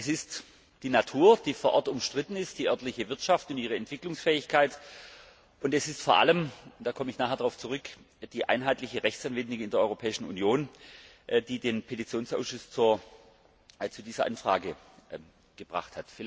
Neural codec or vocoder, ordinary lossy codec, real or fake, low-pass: none; none; real; none